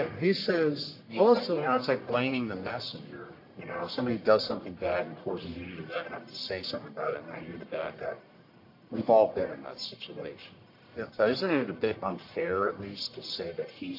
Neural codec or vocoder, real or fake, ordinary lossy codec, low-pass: codec, 44.1 kHz, 1.7 kbps, Pupu-Codec; fake; AAC, 32 kbps; 5.4 kHz